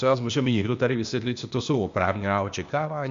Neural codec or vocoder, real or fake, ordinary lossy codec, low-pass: codec, 16 kHz, 0.8 kbps, ZipCodec; fake; MP3, 64 kbps; 7.2 kHz